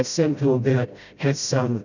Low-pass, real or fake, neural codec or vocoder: 7.2 kHz; fake; codec, 16 kHz, 0.5 kbps, FreqCodec, smaller model